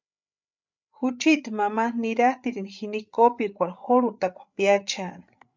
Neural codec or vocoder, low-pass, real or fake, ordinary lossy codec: codec, 16 kHz, 8 kbps, FreqCodec, larger model; 7.2 kHz; fake; MP3, 64 kbps